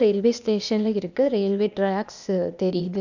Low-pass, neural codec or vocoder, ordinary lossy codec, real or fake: 7.2 kHz; codec, 16 kHz, 0.8 kbps, ZipCodec; none; fake